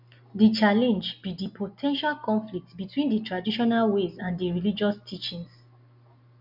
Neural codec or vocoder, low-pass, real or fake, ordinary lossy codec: none; 5.4 kHz; real; none